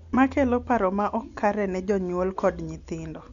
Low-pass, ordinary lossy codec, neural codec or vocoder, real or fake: 7.2 kHz; none; none; real